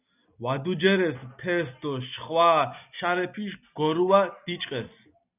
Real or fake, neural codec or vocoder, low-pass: real; none; 3.6 kHz